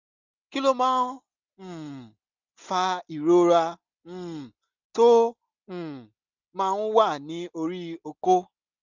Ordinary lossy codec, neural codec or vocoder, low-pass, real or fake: none; none; 7.2 kHz; real